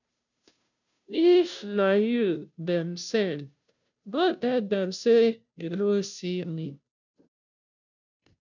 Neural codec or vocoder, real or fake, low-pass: codec, 16 kHz, 0.5 kbps, FunCodec, trained on Chinese and English, 25 frames a second; fake; 7.2 kHz